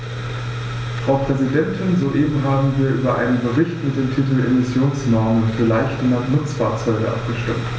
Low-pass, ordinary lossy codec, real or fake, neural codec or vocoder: none; none; real; none